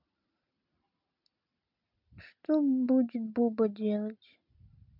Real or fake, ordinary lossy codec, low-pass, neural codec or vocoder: real; MP3, 48 kbps; 5.4 kHz; none